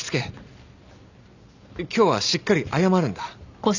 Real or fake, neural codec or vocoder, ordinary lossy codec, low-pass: real; none; none; 7.2 kHz